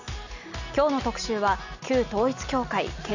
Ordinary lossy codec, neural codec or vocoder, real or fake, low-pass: none; none; real; 7.2 kHz